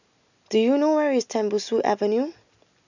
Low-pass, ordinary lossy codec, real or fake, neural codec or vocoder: 7.2 kHz; none; real; none